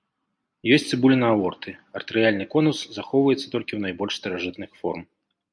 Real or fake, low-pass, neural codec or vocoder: real; 9.9 kHz; none